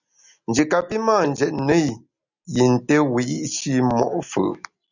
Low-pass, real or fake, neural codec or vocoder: 7.2 kHz; real; none